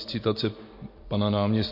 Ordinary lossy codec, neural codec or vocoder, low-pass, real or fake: MP3, 32 kbps; none; 5.4 kHz; real